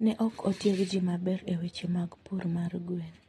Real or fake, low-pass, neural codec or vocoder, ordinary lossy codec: real; 19.8 kHz; none; AAC, 32 kbps